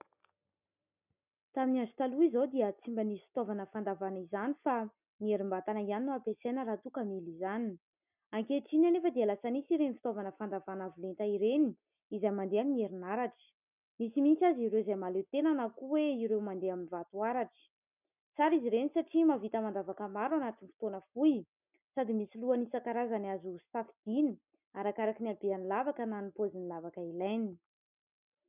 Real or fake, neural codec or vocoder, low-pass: real; none; 3.6 kHz